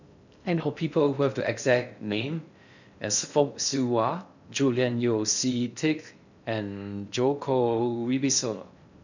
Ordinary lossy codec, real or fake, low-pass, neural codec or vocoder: none; fake; 7.2 kHz; codec, 16 kHz in and 24 kHz out, 0.6 kbps, FocalCodec, streaming, 2048 codes